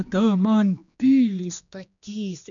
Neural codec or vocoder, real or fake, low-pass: codec, 16 kHz, 2 kbps, X-Codec, HuBERT features, trained on general audio; fake; 7.2 kHz